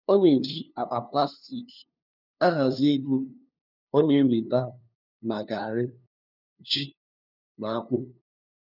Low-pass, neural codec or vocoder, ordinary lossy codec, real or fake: 5.4 kHz; codec, 16 kHz, 2 kbps, FunCodec, trained on LibriTTS, 25 frames a second; none; fake